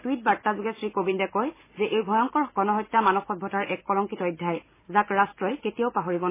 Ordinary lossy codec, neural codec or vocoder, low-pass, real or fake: MP3, 16 kbps; none; 3.6 kHz; real